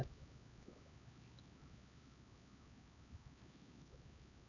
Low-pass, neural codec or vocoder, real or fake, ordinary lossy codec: 7.2 kHz; codec, 16 kHz, 4 kbps, X-Codec, HuBERT features, trained on LibriSpeech; fake; none